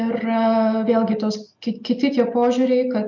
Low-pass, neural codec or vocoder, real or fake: 7.2 kHz; none; real